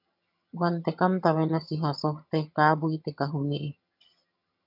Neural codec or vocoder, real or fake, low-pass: vocoder, 22.05 kHz, 80 mel bands, HiFi-GAN; fake; 5.4 kHz